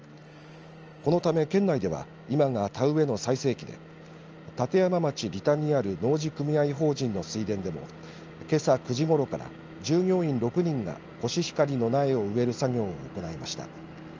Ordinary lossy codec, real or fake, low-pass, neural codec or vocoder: Opus, 24 kbps; real; 7.2 kHz; none